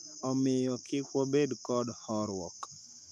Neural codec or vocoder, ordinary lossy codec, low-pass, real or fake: autoencoder, 48 kHz, 128 numbers a frame, DAC-VAE, trained on Japanese speech; none; 14.4 kHz; fake